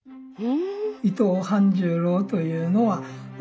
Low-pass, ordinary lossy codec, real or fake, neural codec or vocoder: none; none; real; none